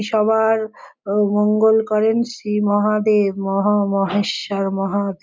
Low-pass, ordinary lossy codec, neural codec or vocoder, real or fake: none; none; none; real